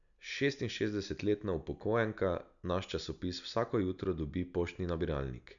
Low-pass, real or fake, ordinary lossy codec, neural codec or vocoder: 7.2 kHz; real; none; none